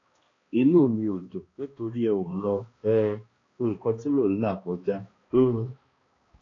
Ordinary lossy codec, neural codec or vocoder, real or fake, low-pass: AAC, 32 kbps; codec, 16 kHz, 1 kbps, X-Codec, HuBERT features, trained on balanced general audio; fake; 7.2 kHz